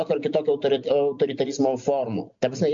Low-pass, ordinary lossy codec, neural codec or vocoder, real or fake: 7.2 kHz; MP3, 48 kbps; none; real